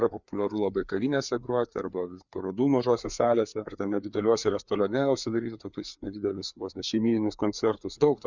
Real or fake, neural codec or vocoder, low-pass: fake; codec, 16 kHz, 4 kbps, FreqCodec, larger model; 7.2 kHz